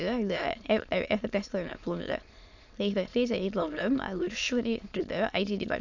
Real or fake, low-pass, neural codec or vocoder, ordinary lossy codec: fake; 7.2 kHz; autoencoder, 22.05 kHz, a latent of 192 numbers a frame, VITS, trained on many speakers; none